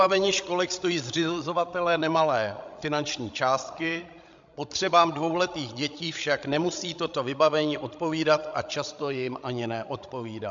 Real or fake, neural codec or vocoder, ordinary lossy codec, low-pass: fake; codec, 16 kHz, 16 kbps, FreqCodec, larger model; MP3, 64 kbps; 7.2 kHz